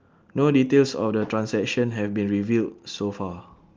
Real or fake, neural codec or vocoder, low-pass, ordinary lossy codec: real; none; 7.2 kHz; Opus, 32 kbps